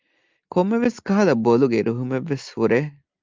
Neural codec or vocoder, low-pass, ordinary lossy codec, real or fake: none; 7.2 kHz; Opus, 24 kbps; real